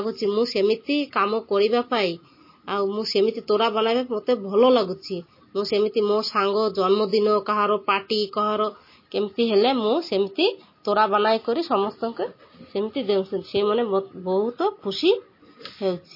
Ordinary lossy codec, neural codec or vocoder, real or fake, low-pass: MP3, 24 kbps; none; real; 5.4 kHz